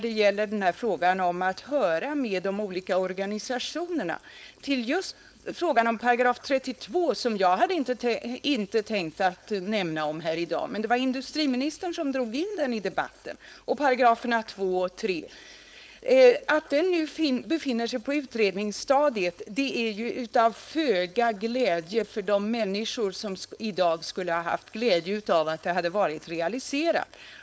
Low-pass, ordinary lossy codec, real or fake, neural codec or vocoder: none; none; fake; codec, 16 kHz, 4.8 kbps, FACodec